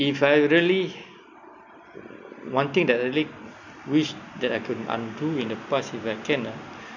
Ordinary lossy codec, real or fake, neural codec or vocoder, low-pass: none; real; none; 7.2 kHz